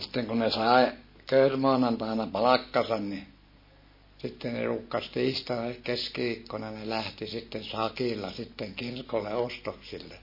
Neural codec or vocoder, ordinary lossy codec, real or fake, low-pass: none; MP3, 24 kbps; real; 5.4 kHz